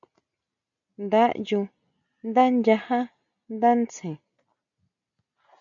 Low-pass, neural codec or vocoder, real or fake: 7.2 kHz; none; real